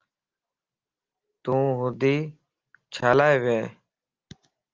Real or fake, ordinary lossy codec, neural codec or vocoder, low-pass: real; Opus, 32 kbps; none; 7.2 kHz